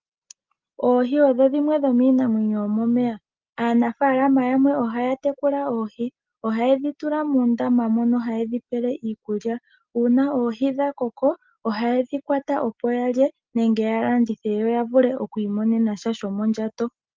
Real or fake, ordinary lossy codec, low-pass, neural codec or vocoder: real; Opus, 32 kbps; 7.2 kHz; none